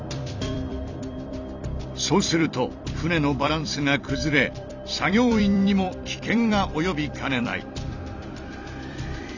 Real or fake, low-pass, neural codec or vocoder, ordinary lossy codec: fake; 7.2 kHz; vocoder, 44.1 kHz, 128 mel bands every 256 samples, BigVGAN v2; none